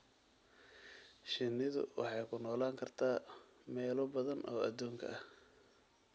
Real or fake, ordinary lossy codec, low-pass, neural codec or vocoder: real; none; none; none